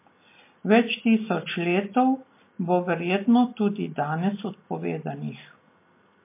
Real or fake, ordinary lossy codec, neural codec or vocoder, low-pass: real; MP3, 24 kbps; none; 3.6 kHz